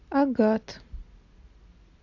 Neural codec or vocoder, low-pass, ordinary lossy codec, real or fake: none; 7.2 kHz; AAC, 48 kbps; real